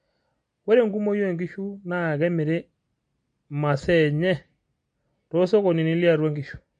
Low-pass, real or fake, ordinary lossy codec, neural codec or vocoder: 9.9 kHz; real; MP3, 48 kbps; none